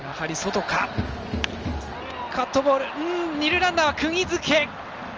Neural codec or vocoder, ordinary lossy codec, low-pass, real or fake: none; Opus, 24 kbps; 7.2 kHz; real